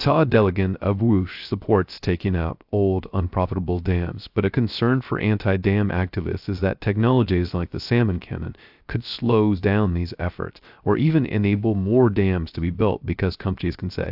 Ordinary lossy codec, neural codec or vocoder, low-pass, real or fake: AAC, 48 kbps; codec, 16 kHz, 0.3 kbps, FocalCodec; 5.4 kHz; fake